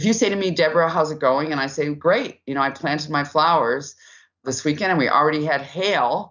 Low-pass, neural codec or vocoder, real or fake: 7.2 kHz; none; real